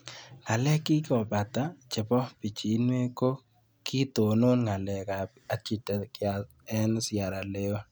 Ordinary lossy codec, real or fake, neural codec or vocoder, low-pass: none; real; none; none